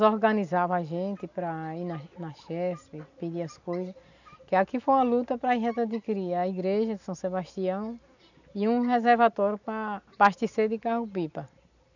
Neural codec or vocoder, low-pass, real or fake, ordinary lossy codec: none; 7.2 kHz; real; none